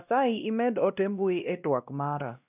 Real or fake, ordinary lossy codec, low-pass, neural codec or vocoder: fake; none; 3.6 kHz; codec, 16 kHz, 1 kbps, X-Codec, WavLM features, trained on Multilingual LibriSpeech